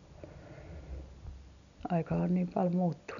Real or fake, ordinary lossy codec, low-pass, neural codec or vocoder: real; none; 7.2 kHz; none